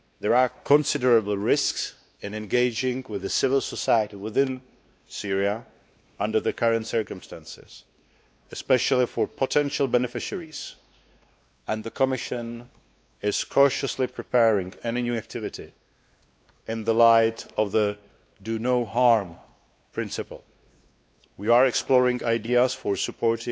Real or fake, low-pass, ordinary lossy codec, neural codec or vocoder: fake; none; none; codec, 16 kHz, 2 kbps, X-Codec, WavLM features, trained on Multilingual LibriSpeech